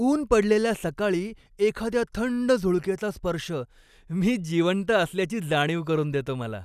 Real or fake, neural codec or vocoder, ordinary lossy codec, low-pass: real; none; none; 14.4 kHz